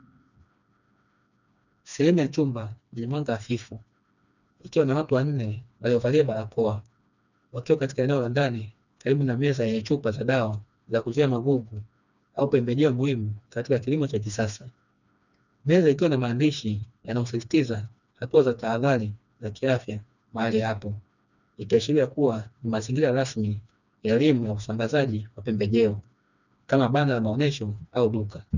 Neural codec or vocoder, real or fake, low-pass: codec, 16 kHz, 2 kbps, FreqCodec, smaller model; fake; 7.2 kHz